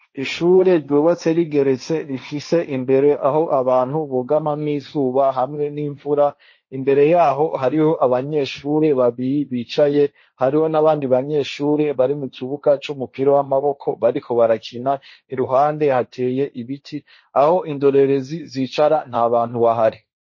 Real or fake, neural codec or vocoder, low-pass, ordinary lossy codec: fake; codec, 16 kHz, 1.1 kbps, Voila-Tokenizer; 7.2 kHz; MP3, 32 kbps